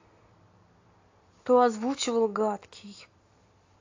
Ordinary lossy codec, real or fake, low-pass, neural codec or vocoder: AAC, 48 kbps; real; 7.2 kHz; none